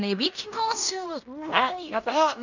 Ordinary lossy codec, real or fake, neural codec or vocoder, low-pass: none; fake; codec, 16 kHz in and 24 kHz out, 0.9 kbps, LongCat-Audio-Codec, fine tuned four codebook decoder; 7.2 kHz